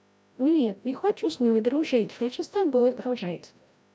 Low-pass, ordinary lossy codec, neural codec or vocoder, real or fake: none; none; codec, 16 kHz, 0.5 kbps, FreqCodec, larger model; fake